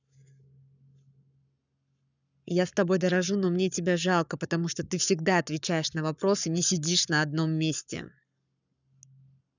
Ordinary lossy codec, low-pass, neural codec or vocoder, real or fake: none; 7.2 kHz; codec, 44.1 kHz, 7.8 kbps, Pupu-Codec; fake